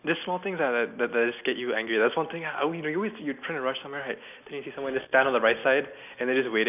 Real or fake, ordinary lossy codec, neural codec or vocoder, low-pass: real; none; none; 3.6 kHz